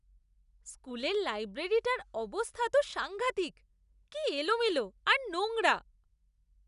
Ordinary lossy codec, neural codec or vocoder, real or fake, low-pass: none; none; real; 10.8 kHz